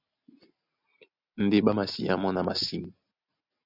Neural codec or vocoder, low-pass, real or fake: none; 5.4 kHz; real